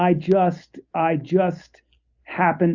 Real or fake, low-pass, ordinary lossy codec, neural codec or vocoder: real; 7.2 kHz; Opus, 64 kbps; none